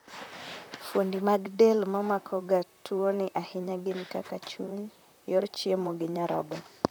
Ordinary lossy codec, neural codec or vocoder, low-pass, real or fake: none; vocoder, 44.1 kHz, 128 mel bands, Pupu-Vocoder; none; fake